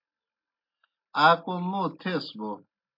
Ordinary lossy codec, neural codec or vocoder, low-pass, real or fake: MP3, 32 kbps; none; 5.4 kHz; real